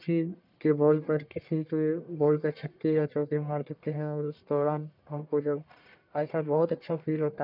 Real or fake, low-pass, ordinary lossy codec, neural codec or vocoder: fake; 5.4 kHz; none; codec, 44.1 kHz, 1.7 kbps, Pupu-Codec